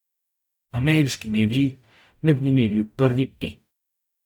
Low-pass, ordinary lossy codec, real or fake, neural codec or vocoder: 19.8 kHz; none; fake; codec, 44.1 kHz, 0.9 kbps, DAC